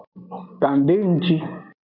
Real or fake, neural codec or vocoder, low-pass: real; none; 5.4 kHz